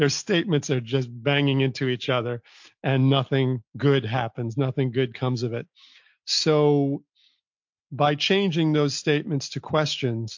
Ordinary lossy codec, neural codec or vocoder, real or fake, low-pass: MP3, 48 kbps; none; real; 7.2 kHz